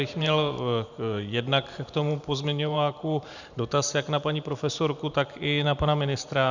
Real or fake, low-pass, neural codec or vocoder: fake; 7.2 kHz; vocoder, 44.1 kHz, 128 mel bands every 512 samples, BigVGAN v2